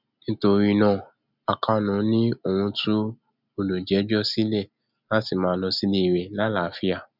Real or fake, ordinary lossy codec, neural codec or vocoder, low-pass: real; none; none; 5.4 kHz